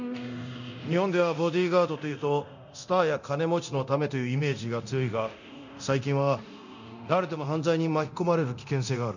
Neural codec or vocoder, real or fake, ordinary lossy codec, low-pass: codec, 24 kHz, 0.9 kbps, DualCodec; fake; none; 7.2 kHz